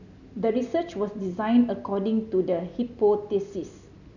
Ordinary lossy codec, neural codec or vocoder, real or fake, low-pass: none; none; real; 7.2 kHz